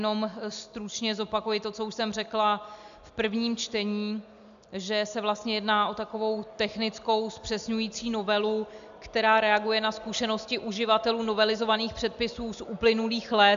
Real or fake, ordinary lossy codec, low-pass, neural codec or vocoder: real; AAC, 96 kbps; 7.2 kHz; none